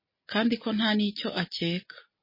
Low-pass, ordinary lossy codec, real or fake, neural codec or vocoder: 5.4 kHz; MP3, 24 kbps; real; none